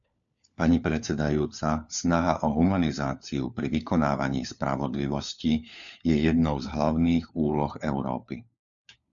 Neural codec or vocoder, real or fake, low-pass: codec, 16 kHz, 4 kbps, FunCodec, trained on LibriTTS, 50 frames a second; fake; 7.2 kHz